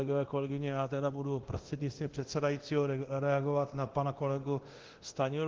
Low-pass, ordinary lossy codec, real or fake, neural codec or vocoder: 7.2 kHz; Opus, 16 kbps; fake; codec, 24 kHz, 0.9 kbps, DualCodec